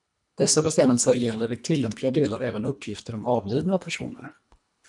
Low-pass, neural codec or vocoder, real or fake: 10.8 kHz; codec, 24 kHz, 1.5 kbps, HILCodec; fake